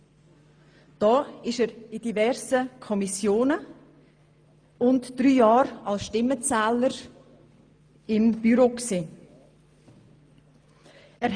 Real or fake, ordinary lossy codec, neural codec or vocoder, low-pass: real; Opus, 32 kbps; none; 9.9 kHz